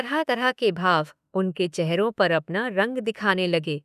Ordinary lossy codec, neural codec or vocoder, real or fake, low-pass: none; autoencoder, 48 kHz, 32 numbers a frame, DAC-VAE, trained on Japanese speech; fake; 14.4 kHz